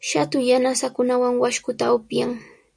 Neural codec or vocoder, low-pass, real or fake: none; 9.9 kHz; real